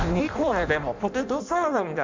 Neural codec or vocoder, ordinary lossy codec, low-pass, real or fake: codec, 16 kHz in and 24 kHz out, 0.6 kbps, FireRedTTS-2 codec; MP3, 64 kbps; 7.2 kHz; fake